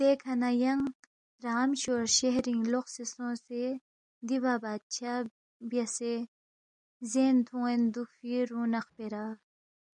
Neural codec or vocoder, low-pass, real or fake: none; 9.9 kHz; real